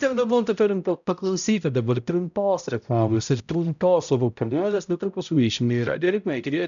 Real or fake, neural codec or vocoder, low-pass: fake; codec, 16 kHz, 0.5 kbps, X-Codec, HuBERT features, trained on balanced general audio; 7.2 kHz